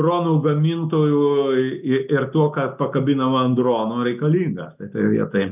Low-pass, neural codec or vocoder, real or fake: 3.6 kHz; none; real